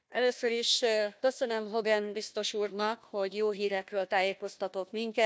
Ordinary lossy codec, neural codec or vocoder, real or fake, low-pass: none; codec, 16 kHz, 1 kbps, FunCodec, trained on Chinese and English, 50 frames a second; fake; none